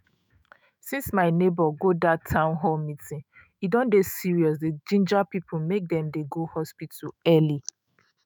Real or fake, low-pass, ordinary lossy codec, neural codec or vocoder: fake; none; none; autoencoder, 48 kHz, 128 numbers a frame, DAC-VAE, trained on Japanese speech